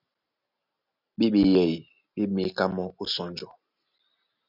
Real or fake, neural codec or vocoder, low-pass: real; none; 5.4 kHz